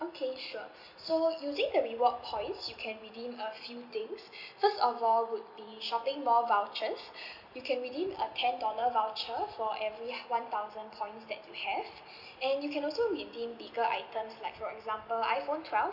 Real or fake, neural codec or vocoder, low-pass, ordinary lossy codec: real; none; 5.4 kHz; none